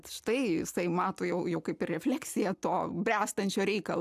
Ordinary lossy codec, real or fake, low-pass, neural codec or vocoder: AAC, 96 kbps; real; 14.4 kHz; none